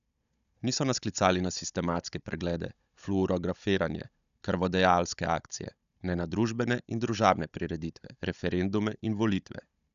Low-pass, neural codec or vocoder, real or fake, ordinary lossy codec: 7.2 kHz; codec, 16 kHz, 16 kbps, FunCodec, trained on Chinese and English, 50 frames a second; fake; none